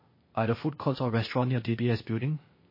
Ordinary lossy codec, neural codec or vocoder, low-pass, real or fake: MP3, 24 kbps; codec, 16 kHz, 0.8 kbps, ZipCodec; 5.4 kHz; fake